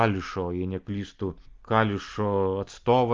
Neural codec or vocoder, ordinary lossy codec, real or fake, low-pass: none; Opus, 32 kbps; real; 7.2 kHz